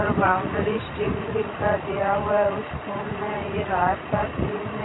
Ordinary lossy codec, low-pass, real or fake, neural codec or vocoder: AAC, 16 kbps; 7.2 kHz; fake; vocoder, 44.1 kHz, 80 mel bands, Vocos